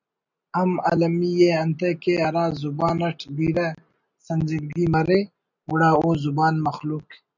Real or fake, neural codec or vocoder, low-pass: real; none; 7.2 kHz